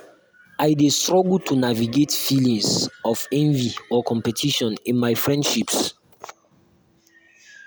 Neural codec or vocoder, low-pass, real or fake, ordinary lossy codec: none; none; real; none